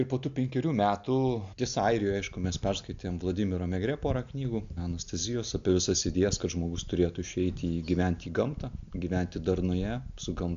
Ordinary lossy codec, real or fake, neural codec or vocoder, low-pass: AAC, 64 kbps; real; none; 7.2 kHz